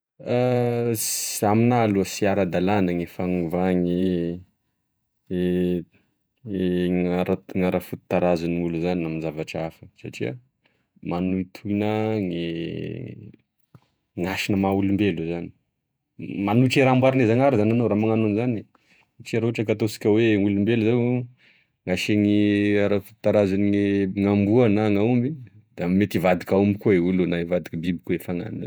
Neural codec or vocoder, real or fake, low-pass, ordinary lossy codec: vocoder, 48 kHz, 128 mel bands, Vocos; fake; none; none